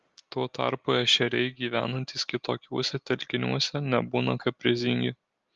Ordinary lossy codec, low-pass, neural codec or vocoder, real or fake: Opus, 32 kbps; 7.2 kHz; none; real